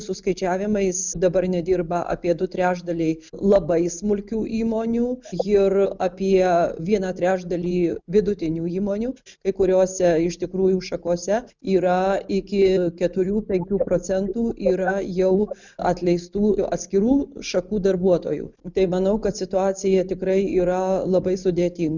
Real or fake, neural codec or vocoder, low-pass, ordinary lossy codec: real; none; 7.2 kHz; Opus, 64 kbps